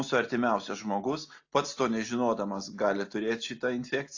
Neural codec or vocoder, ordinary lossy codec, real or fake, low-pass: none; AAC, 48 kbps; real; 7.2 kHz